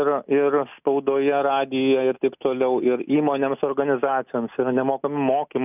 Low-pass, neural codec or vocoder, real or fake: 3.6 kHz; none; real